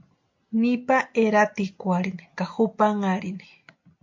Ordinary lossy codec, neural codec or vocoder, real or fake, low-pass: MP3, 48 kbps; none; real; 7.2 kHz